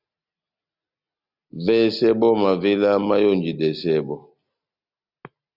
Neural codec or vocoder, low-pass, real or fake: none; 5.4 kHz; real